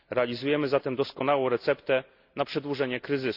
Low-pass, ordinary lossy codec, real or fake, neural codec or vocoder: 5.4 kHz; Opus, 64 kbps; real; none